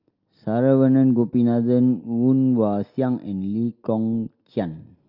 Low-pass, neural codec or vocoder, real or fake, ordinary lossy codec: 5.4 kHz; none; real; Opus, 32 kbps